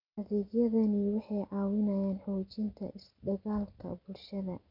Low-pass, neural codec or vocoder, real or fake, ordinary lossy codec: 5.4 kHz; none; real; none